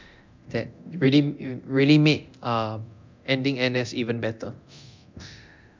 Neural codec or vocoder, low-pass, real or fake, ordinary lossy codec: codec, 24 kHz, 0.9 kbps, DualCodec; 7.2 kHz; fake; MP3, 64 kbps